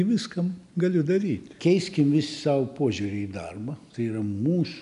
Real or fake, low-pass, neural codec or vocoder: real; 10.8 kHz; none